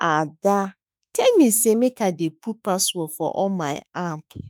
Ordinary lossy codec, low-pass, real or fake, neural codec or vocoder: none; none; fake; autoencoder, 48 kHz, 32 numbers a frame, DAC-VAE, trained on Japanese speech